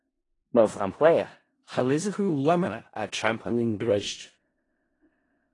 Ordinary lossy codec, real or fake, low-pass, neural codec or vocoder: AAC, 32 kbps; fake; 10.8 kHz; codec, 16 kHz in and 24 kHz out, 0.4 kbps, LongCat-Audio-Codec, four codebook decoder